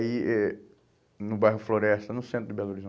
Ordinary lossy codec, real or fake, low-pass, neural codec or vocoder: none; real; none; none